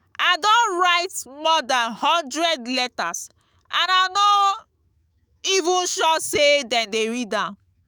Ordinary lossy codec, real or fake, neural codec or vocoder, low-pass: none; fake; autoencoder, 48 kHz, 128 numbers a frame, DAC-VAE, trained on Japanese speech; none